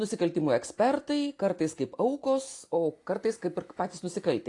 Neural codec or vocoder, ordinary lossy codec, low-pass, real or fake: none; AAC, 48 kbps; 10.8 kHz; real